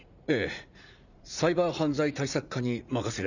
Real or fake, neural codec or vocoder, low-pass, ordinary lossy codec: fake; vocoder, 44.1 kHz, 80 mel bands, Vocos; 7.2 kHz; none